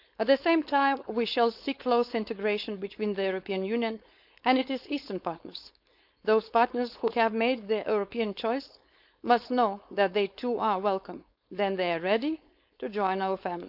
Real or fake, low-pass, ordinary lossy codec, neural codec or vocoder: fake; 5.4 kHz; none; codec, 16 kHz, 4.8 kbps, FACodec